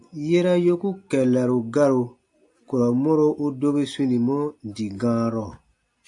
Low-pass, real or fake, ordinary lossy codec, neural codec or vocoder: 10.8 kHz; real; AAC, 64 kbps; none